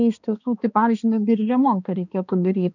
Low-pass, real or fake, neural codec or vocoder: 7.2 kHz; fake; codec, 16 kHz, 2 kbps, X-Codec, HuBERT features, trained on balanced general audio